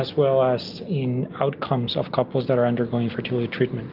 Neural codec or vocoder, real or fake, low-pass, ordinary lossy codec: none; real; 5.4 kHz; Opus, 32 kbps